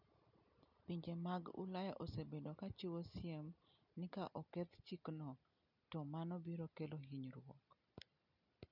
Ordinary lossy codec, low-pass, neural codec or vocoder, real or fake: none; 5.4 kHz; none; real